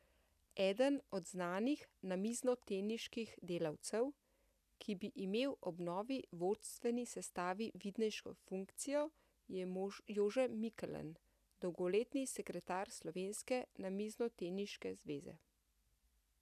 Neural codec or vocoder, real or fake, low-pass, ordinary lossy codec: none; real; 14.4 kHz; none